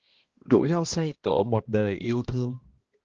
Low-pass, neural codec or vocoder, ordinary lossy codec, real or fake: 7.2 kHz; codec, 16 kHz, 1 kbps, X-Codec, HuBERT features, trained on balanced general audio; Opus, 16 kbps; fake